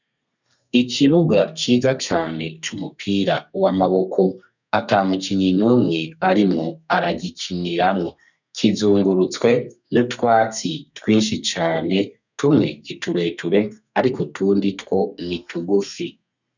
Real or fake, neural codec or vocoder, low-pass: fake; codec, 32 kHz, 1.9 kbps, SNAC; 7.2 kHz